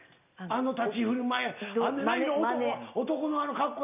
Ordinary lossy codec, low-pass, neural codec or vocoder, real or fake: none; 3.6 kHz; none; real